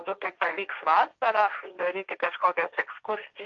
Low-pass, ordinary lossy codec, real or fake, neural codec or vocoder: 7.2 kHz; Opus, 24 kbps; fake; codec, 16 kHz, 1.1 kbps, Voila-Tokenizer